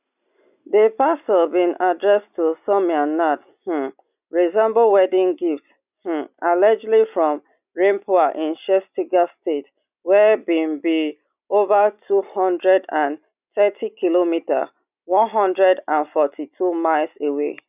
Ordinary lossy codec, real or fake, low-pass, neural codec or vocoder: none; real; 3.6 kHz; none